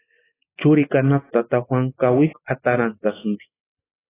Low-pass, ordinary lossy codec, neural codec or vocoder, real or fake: 3.6 kHz; AAC, 16 kbps; none; real